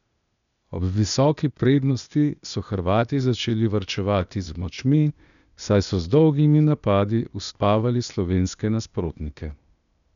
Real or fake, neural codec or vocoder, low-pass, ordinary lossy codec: fake; codec, 16 kHz, 0.8 kbps, ZipCodec; 7.2 kHz; none